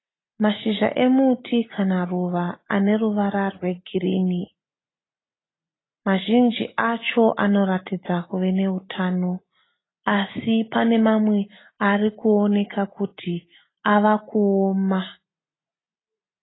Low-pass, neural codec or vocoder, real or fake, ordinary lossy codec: 7.2 kHz; none; real; AAC, 16 kbps